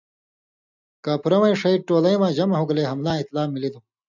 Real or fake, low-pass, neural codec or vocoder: real; 7.2 kHz; none